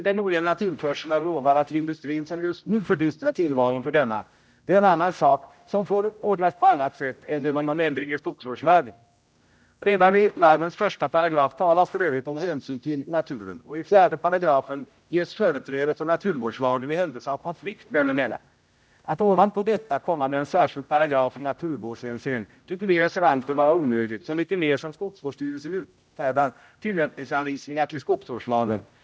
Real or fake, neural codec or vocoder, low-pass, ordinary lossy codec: fake; codec, 16 kHz, 0.5 kbps, X-Codec, HuBERT features, trained on general audio; none; none